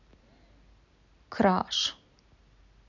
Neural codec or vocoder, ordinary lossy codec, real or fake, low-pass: none; none; real; 7.2 kHz